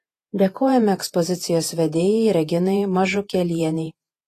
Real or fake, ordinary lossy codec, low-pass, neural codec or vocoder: fake; AAC, 48 kbps; 14.4 kHz; vocoder, 48 kHz, 128 mel bands, Vocos